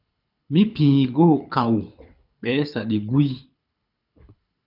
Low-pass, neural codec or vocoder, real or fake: 5.4 kHz; codec, 24 kHz, 6 kbps, HILCodec; fake